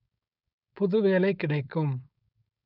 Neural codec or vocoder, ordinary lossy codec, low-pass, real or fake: codec, 16 kHz, 4.8 kbps, FACodec; none; 5.4 kHz; fake